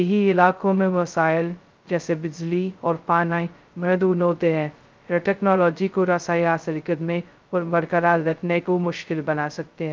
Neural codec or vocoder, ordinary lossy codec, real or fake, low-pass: codec, 16 kHz, 0.2 kbps, FocalCodec; Opus, 24 kbps; fake; 7.2 kHz